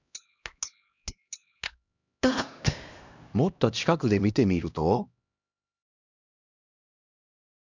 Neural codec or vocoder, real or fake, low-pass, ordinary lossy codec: codec, 16 kHz, 1 kbps, X-Codec, HuBERT features, trained on LibriSpeech; fake; 7.2 kHz; none